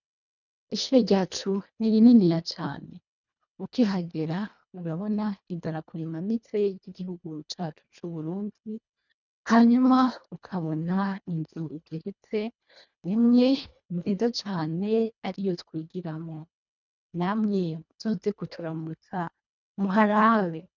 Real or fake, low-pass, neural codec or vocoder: fake; 7.2 kHz; codec, 24 kHz, 1.5 kbps, HILCodec